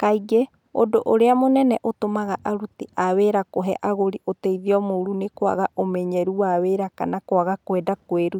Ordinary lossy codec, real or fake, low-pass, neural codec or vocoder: none; real; 19.8 kHz; none